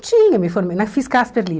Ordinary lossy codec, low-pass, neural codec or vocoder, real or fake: none; none; none; real